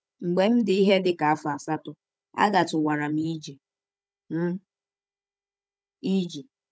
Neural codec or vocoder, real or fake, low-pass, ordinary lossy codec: codec, 16 kHz, 16 kbps, FunCodec, trained on Chinese and English, 50 frames a second; fake; none; none